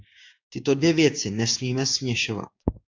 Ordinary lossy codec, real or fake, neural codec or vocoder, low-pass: AAC, 48 kbps; fake; codec, 16 kHz, 6 kbps, DAC; 7.2 kHz